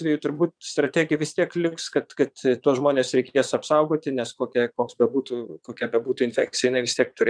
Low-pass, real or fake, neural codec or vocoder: 9.9 kHz; fake; vocoder, 22.05 kHz, 80 mel bands, Vocos